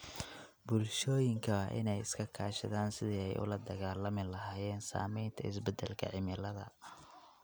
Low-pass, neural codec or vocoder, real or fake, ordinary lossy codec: none; none; real; none